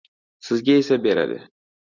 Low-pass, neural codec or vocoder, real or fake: 7.2 kHz; none; real